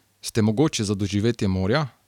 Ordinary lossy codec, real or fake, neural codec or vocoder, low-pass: none; real; none; 19.8 kHz